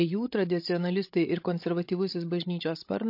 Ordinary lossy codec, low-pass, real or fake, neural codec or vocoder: MP3, 32 kbps; 5.4 kHz; fake; codec, 16 kHz, 16 kbps, FreqCodec, larger model